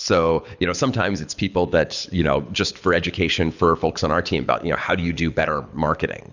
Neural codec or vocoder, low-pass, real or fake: codec, 24 kHz, 6 kbps, HILCodec; 7.2 kHz; fake